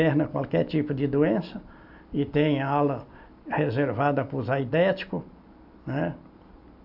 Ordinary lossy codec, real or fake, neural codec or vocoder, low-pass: AAC, 48 kbps; real; none; 5.4 kHz